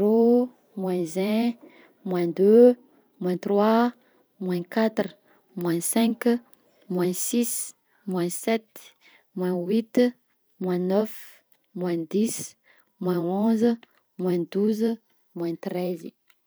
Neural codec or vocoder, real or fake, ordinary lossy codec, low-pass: vocoder, 44.1 kHz, 128 mel bands every 512 samples, BigVGAN v2; fake; none; none